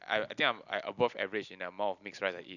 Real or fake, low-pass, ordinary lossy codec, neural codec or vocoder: real; 7.2 kHz; none; none